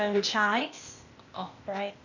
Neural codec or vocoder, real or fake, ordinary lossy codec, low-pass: codec, 16 kHz, 0.8 kbps, ZipCodec; fake; none; 7.2 kHz